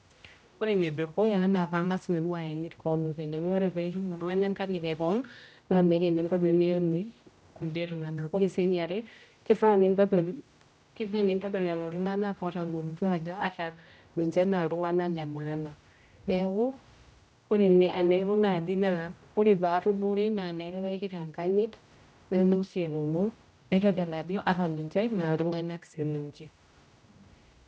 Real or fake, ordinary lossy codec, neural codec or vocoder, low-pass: fake; none; codec, 16 kHz, 0.5 kbps, X-Codec, HuBERT features, trained on general audio; none